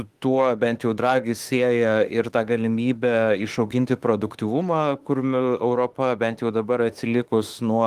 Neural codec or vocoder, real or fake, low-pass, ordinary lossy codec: autoencoder, 48 kHz, 32 numbers a frame, DAC-VAE, trained on Japanese speech; fake; 14.4 kHz; Opus, 24 kbps